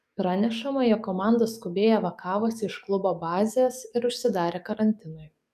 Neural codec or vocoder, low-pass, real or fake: codec, 44.1 kHz, 7.8 kbps, DAC; 14.4 kHz; fake